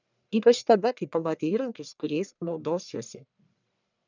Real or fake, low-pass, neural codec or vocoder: fake; 7.2 kHz; codec, 44.1 kHz, 1.7 kbps, Pupu-Codec